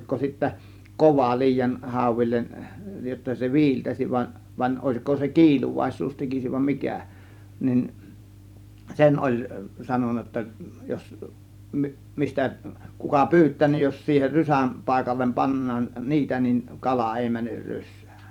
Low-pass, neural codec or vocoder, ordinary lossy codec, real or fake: 19.8 kHz; vocoder, 44.1 kHz, 128 mel bands every 512 samples, BigVGAN v2; none; fake